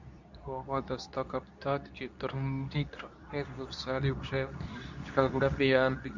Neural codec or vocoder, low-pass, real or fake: codec, 24 kHz, 0.9 kbps, WavTokenizer, medium speech release version 2; 7.2 kHz; fake